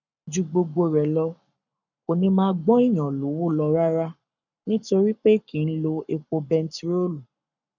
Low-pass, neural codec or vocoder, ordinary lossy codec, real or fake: 7.2 kHz; codec, 44.1 kHz, 7.8 kbps, Pupu-Codec; none; fake